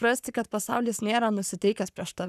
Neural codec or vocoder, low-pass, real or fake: codec, 44.1 kHz, 7.8 kbps, Pupu-Codec; 14.4 kHz; fake